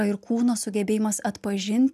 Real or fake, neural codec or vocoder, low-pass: real; none; 14.4 kHz